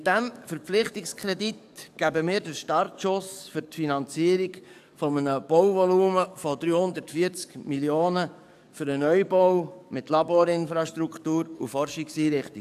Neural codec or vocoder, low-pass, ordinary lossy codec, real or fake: codec, 44.1 kHz, 7.8 kbps, DAC; 14.4 kHz; AAC, 96 kbps; fake